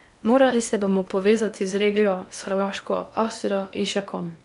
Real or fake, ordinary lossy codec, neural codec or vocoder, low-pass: fake; none; codec, 16 kHz in and 24 kHz out, 0.8 kbps, FocalCodec, streaming, 65536 codes; 10.8 kHz